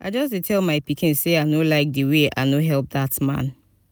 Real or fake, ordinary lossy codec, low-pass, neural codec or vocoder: real; none; none; none